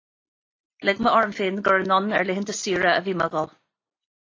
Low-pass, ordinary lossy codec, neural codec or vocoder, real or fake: 7.2 kHz; AAC, 32 kbps; none; real